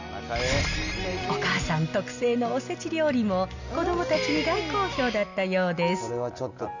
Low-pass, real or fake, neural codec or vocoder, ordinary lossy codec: 7.2 kHz; real; none; none